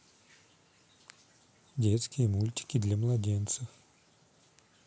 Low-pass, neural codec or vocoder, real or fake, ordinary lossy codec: none; none; real; none